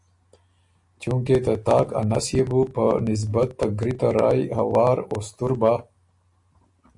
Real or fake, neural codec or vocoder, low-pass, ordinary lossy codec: real; none; 10.8 kHz; AAC, 64 kbps